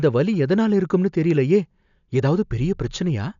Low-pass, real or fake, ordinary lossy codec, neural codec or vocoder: 7.2 kHz; real; none; none